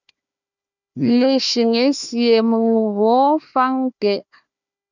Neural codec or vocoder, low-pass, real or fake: codec, 16 kHz, 1 kbps, FunCodec, trained on Chinese and English, 50 frames a second; 7.2 kHz; fake